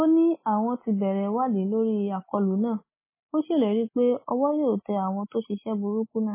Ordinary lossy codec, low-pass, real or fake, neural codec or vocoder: MP3, 16 kbps; 3.6 kHz; real; none